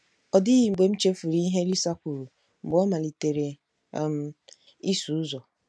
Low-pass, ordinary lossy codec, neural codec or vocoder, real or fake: none; none; none; real